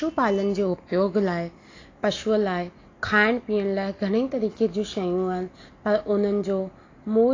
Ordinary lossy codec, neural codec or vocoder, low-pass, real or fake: AAC, 32 kbps; none; 7.2 kHz; real